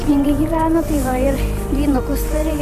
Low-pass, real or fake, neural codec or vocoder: 14.4 kHz; fake; vocoder, 44.1 kHz, 128 mel bands every 512 samples, BigVGAN v2